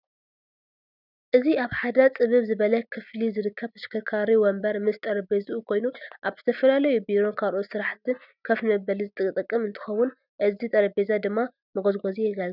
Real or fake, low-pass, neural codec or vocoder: real; 5.4 kHz; none